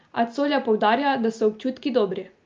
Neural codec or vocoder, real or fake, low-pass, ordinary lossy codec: none; real; 7.2 kHz; Opus, 24 kbps